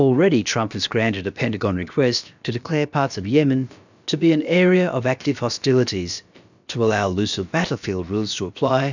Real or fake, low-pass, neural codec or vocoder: fake; 7.2 kHz; codec, 16 kHz, about 1 kbps, DyCAST, with the encoder's durations